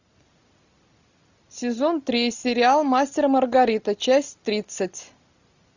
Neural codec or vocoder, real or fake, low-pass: none; real; 7.2 kHz